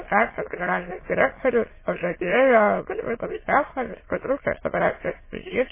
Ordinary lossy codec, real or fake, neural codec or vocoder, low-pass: MP3, 16 kbps; fake; autoencoder, 22.05 kHz, a latent of 192 numbers a frame, VITS, trained on many speakers; 3.6 kHz